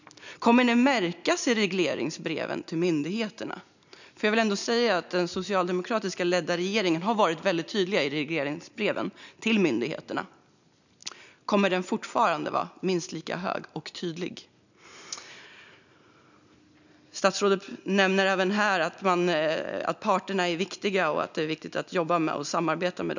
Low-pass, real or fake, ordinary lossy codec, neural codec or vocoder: 7.2 kHz; real; none; none